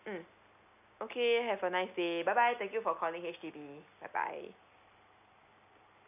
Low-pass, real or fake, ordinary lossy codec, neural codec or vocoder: 3.6 kHz; real; none; none